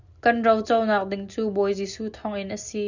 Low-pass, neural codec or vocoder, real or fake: 7.2 kHz; none; real